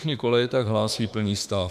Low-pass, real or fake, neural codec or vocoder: 14.4 kHz; fake; autoencoder, 48 kHz, 32 numbers a frame, DAC-VAE, trained on Japanese speech